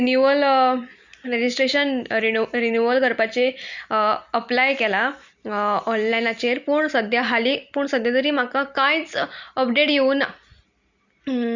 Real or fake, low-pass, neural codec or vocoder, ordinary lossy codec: real; 7.2 kHz; none; Opus, 64 kbps